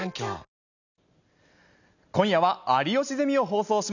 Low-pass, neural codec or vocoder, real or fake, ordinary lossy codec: 7.2 kHz; none; real; none